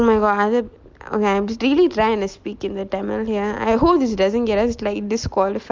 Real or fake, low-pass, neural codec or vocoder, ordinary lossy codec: real; 7.2 kHz; none; Opus, 24 kbps